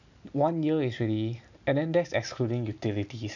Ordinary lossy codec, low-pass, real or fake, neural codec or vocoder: none; 7.2 kHz; real; none